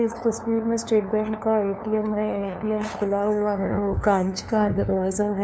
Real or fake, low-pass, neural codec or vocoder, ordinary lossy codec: fake; none; codec, 16 kHz, 2 kbps, FunCodec, trained on LibriTTS, 25 frames a second; none